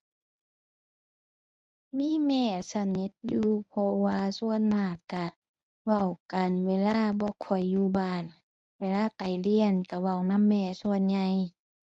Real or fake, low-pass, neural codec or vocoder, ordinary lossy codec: fake; 10.8 kHz; codec, 24 kHz, 0.9 kbps, WavTokenizer, medium speech release version 1; none